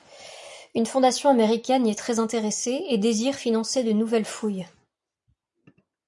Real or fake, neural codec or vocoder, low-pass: real; none; 10.8 kHz